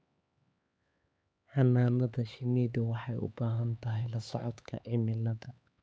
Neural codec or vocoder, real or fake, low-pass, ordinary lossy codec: codec, 16 kHz, 4 kbps, X-Codec, HuBERT features, trained on LibriSpeech; fake; none; none